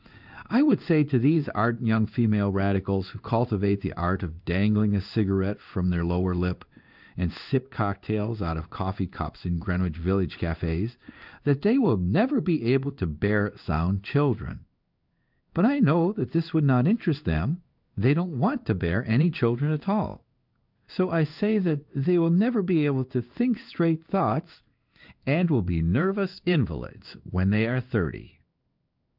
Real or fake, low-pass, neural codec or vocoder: fake; 5.4 kHz; codec, 16 kHz in and 24 kHz out, 1 kbps, XY-Tokenizer